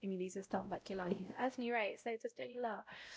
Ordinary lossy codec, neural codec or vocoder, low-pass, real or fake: none; codec, 16 kHz, 0.5 kbps, X-Codec, WavLM features, trained on Multilingual LibriSpeech; none; fake